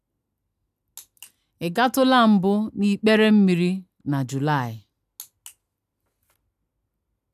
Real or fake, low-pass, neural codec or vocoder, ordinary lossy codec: real; 14.4 kHz; none; none